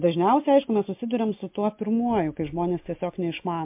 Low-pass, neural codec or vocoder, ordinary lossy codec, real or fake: 3.6 kHz; none; MP3, 32 kbps; real